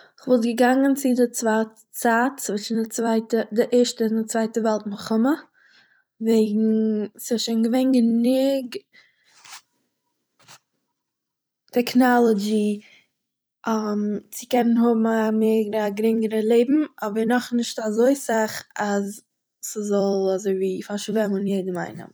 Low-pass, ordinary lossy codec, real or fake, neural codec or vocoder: none; none; fake; vocoder, 44.1 kHz, 128 mel bands every 256 samples, BigVGAN v2